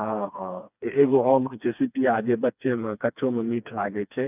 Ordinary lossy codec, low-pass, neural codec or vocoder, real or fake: none; 3.6 kHz; codec, 16 kHz, 2 kbps, FreqCodec, smaller model; fake